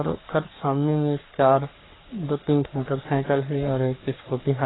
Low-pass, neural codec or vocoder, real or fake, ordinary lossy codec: 7.2 kHz; codec, 44.1 kHz, 3.4 kbps, Pupu-Codec; fake; AAC, 16 kbps